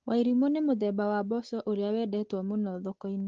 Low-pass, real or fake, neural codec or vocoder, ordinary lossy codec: 7.2 kHz; real; none; Opus, 16 kbps